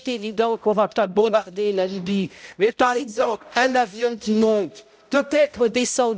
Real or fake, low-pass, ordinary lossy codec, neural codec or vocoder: fake; none; none; codec, 16 kHz, 0.5 kbps, X-Codec, HuBERT features, trained on balanced general audio